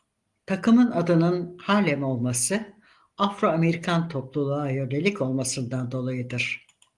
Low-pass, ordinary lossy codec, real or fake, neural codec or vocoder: 10.8 kHz; Opus, 24 kbps; real; none